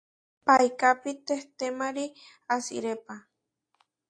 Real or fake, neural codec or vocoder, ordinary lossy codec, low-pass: real; none; AAC, 48 kbps; 9.9 kHz